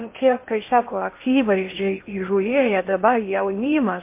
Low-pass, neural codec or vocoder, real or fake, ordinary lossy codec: 3.6 kHz; codec, 16 kHz in and 24 kHz out, 0.6 kbps, FocalCodec, streaming, 2048 codes; fake; MP3, 24 kbps